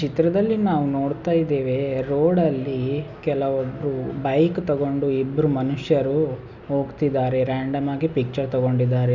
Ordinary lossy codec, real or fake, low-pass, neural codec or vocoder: none; real; 7.2 kHz; none